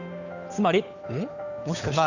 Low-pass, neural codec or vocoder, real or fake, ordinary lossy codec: 7.2 kHz; codec, 16 kHz, 8 kbps, FunCodec, trained on Chinese and English, 25 frames a second; fake; none